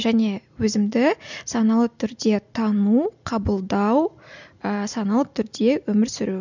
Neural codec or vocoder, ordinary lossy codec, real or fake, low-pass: none; none; real; 7.2 kHz